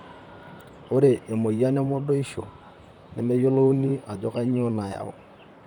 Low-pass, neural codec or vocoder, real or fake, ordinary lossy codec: 19.8 kHz; vocoder, 44.1 kHz, 128 mel bands, Pupu-Vocoder; fake; none